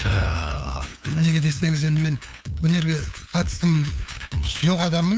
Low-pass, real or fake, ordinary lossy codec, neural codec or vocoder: none; fake; none; codec, 16 kHz, 2 kbps, FunCodec, trained on LibriTTS, 25 frames a second